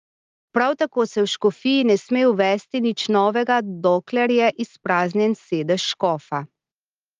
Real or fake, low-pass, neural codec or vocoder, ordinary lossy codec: real; 7.2 kHz; none; Opus, 32 kbps